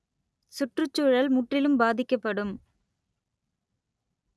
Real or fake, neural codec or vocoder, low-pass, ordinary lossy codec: real; none; none; none